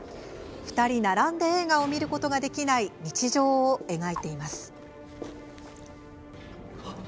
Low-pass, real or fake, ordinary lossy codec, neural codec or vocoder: none; real; none; none